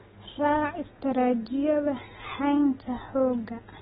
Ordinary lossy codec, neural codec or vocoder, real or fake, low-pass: AAC, 16 kbps; none; real; 7.2 kHz